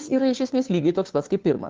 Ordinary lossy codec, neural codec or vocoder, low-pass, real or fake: Opus, 32 kbps; codec, 16 kHz, 2 kbps, FunCodec, trained on Chinese and English, 25 frames a second; 7.2 kHz; fake